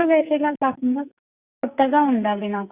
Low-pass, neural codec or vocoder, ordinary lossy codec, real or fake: 3.6 kHz; codec, 44.1 kHz, 2.6 kbps, SNAC; Opus, 64 kbps; fake